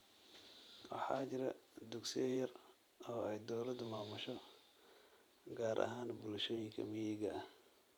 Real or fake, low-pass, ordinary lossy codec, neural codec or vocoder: fake; none; none; vocoder, 44.1 kHz, 128 mel bands every 512 samples, BigVGAN v2